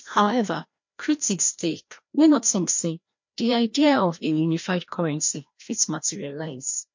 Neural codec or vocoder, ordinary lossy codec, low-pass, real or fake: codec, 16 kHz, 1 kbps, FreqCodec, larger model; MP3, 48 kbps; 7.2 kHz; fake